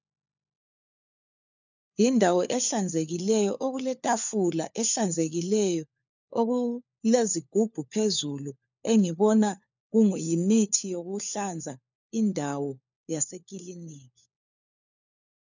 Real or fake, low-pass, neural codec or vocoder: fake; 7.2 kHz; codec, 16 kHz, 4 kbps, FunCodec, trained on LibriTTS, 50 frames a second